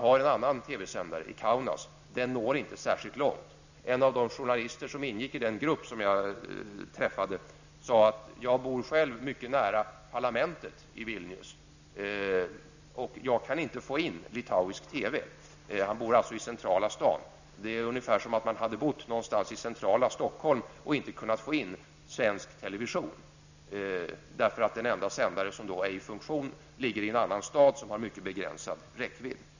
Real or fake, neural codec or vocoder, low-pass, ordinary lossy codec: real; none; 7.2 kHz; none